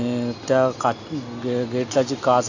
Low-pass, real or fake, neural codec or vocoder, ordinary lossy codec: 7.2 kHz; real; none; none